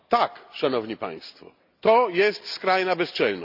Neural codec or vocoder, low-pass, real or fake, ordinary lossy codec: none; 5.4 kHz; real; none